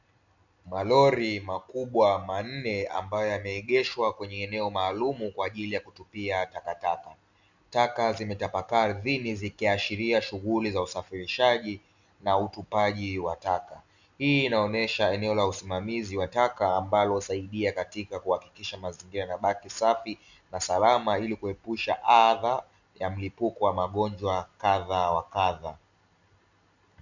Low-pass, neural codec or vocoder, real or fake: 7.2 kHz; none; real